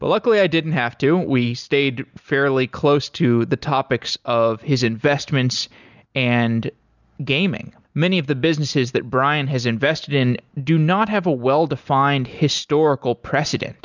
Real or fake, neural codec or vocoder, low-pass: real; none; 7.2 kHz